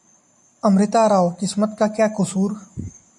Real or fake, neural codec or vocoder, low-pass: real; none; 10.8 kHz